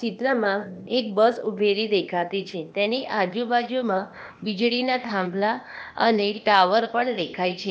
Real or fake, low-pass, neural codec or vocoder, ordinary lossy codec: fake; none; codec, 16 kHz, 0.8 kbps, ZipCodec; none